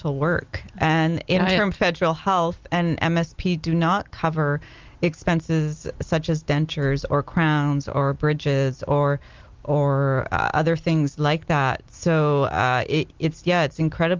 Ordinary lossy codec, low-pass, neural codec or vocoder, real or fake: Opus, 32 kbps; 7.2 kHz; none; real